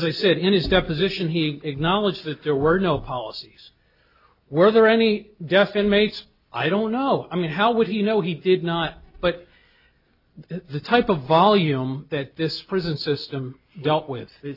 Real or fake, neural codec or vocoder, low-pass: real; none; 5.4 kHz